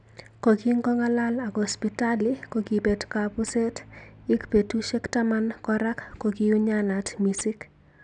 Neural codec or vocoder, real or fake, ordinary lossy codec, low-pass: none; real; none; 9.9 kHz